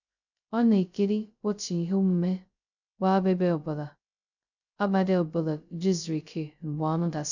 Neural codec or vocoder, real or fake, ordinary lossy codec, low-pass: codec, 16 kHz, 0.2 kbps, FocalCodec; fake; none; 7.2 kHz